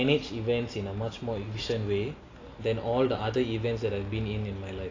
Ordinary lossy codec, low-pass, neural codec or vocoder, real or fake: AAC, 32 kbps; 7.2 kHz; none; real